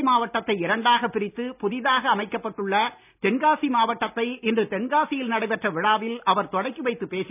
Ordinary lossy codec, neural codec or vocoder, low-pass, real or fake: none; none; 3.6 kHz; real